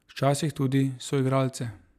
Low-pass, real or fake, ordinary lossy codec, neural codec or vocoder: 14.4 kHz; real; none; none